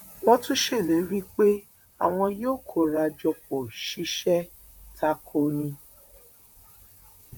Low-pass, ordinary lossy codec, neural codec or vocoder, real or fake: 19.8 kHz; none; vocoder, 44.1 kHz, 128 mel bands, Pupu-Vocoder; fake